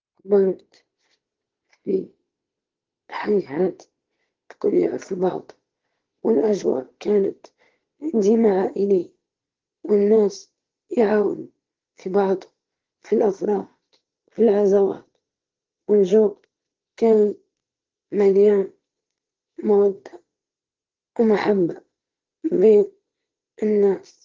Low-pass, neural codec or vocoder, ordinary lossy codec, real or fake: 7.2 kHz; vocoder, 22.05 kHz, 80 mel bands, Vocos; Opus, 16 kbps; fake